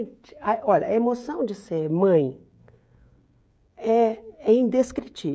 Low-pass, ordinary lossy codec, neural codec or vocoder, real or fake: none; none; codec, 16 kHz, 16 kbps, FreqCodec, smaller model; fake